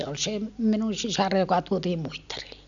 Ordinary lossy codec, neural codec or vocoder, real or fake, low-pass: none; none; real; 7.2 kHz